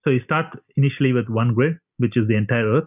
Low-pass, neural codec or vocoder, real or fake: 3.6 kHz; none; real